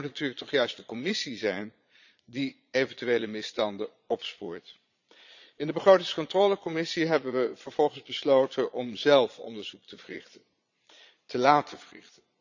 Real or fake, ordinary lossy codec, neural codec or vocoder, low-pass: fake; none; vocoder, 22.05 kHz, 80 mel bands, Vocos; 7.2 kHz